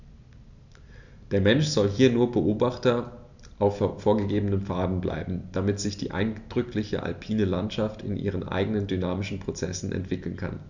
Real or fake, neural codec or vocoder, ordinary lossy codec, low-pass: real; none; none; 7.2 kHz